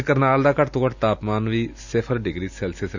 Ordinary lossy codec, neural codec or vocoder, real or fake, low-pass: none; none; real; 7.2 kHz